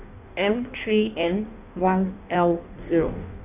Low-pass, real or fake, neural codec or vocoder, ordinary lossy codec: 3.6 kHz; fake; codec, 16 kHz in and 24 kHz out, 1.1 kbps, FireRedTTS-2 codec; none